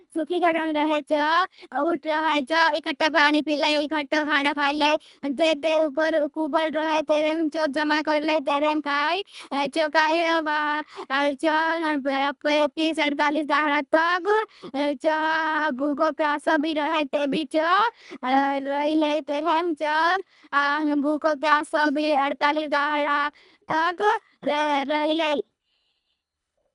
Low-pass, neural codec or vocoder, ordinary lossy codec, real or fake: 10.8 kHz; codec, 24 kHz, 1.5 kbps, HILCodec; none; fake